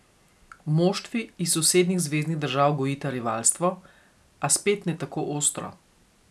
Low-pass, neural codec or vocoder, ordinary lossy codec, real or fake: none; none; none; real